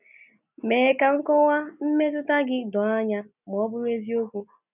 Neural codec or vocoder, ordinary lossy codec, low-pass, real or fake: none; none; 3.6 kHz; real